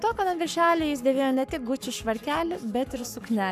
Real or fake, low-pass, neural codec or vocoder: fake; 14.4 kHz; codec, 44.1 kHz, 7.8 kbps, Pupu-Codec